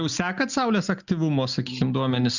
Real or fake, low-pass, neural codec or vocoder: real; 7.2 kHz; none